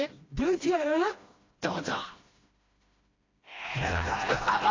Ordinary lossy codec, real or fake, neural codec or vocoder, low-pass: AAC, 32 kbps; fake; codec, 16 kHz, 1 kbps, FreqCodec, smaller model; 7.2 kHz